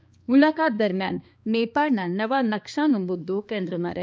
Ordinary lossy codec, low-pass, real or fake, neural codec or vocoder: none; none; fake; codec, 16 kHz, 2 kbps, X-Codec, HuBERT features, trained on balanced general audio